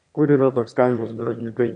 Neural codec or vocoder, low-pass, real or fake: autoencoder, 22.05 kHz, a latent of 192 numbers a frame, VITS, trained on one speaker; 9.9 kHz; fake